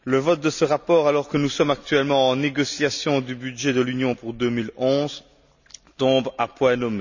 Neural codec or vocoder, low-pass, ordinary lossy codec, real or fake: none; 7.2 kHz; none; real